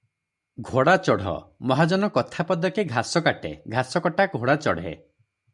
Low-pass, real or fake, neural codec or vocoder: 10.8 kHz; real; none